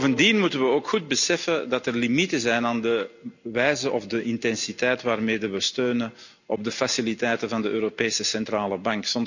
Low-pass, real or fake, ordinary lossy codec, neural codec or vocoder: 7.2 kHz; real; none; none